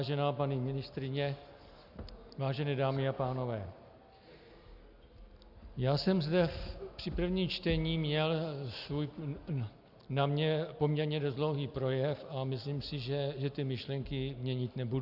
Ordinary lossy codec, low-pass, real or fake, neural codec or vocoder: Opus, 64 kbps; 5.4 kHz; real; none